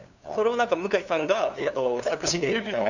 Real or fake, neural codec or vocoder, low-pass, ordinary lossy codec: fake; codec, 16 kHz, 2 kbps, FunCodec, trained on LibriTTS, 25 frames a second; 7.2 kHz; none